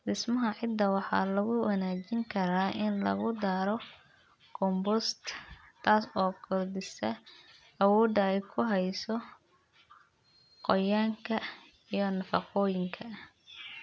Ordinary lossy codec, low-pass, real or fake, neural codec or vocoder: none; none; real; none